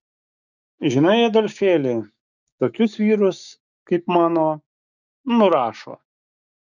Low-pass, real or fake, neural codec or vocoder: 7.2 kHz; fake; codec, 16 kHz, 6 kbps, DAC